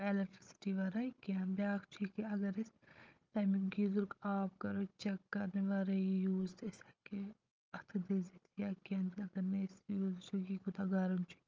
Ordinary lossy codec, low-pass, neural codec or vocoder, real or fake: Opus, 24 kbps; 7.2 kHz; codec, 16 kHz, 16 kbps, FunCodec, trained on Chinese and English, 50 frames a second; fake